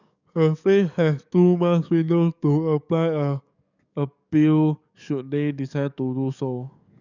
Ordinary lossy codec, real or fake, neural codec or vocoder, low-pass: none; fake; codec, 44.1 kHz, 7.8 kbps, DAC; 7.2 kHz